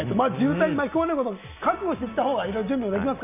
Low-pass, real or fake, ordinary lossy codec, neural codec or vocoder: 3.6 kHz; real; none; none